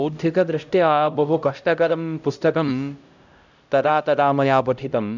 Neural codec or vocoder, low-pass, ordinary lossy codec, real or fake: codec, 16 kHz, 0.5 kbps, X-Codec, HuBERT features, trained on LibriSpeech; 7.2 kHz; none; fake